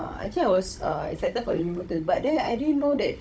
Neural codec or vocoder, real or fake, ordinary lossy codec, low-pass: codec, 16 kHz, 16 kbps, FunCodec, trained on Chinese and English, 50 frames a second; fake; none; none